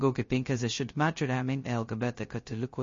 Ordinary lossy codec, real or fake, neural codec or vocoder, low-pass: MP3, 32 kbps; fake; codec, 16 kHz, 0.2 kbps, FocalCodec; 7.2 kHz